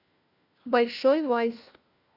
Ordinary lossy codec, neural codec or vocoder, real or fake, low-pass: Opus, 64 kbps; codec, 16 kHz, 1 kbps, FunCodec, trained on LibriTTS, 50 frames a second; fake; 5.4 kHz